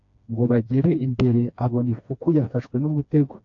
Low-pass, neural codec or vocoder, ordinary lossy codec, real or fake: 7.2 kHz; codec, 16 kHz, 2 kbps, FreqCodec, smaller model; AAC, 48 kbps; fake